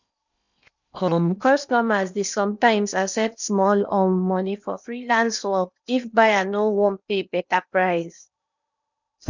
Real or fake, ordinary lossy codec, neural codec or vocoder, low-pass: fake; none; codec, 16 kHz in and 24 kHz out, 0.8 kbps, FocalCodec, streaming, 65536 codes; 7.2 kHz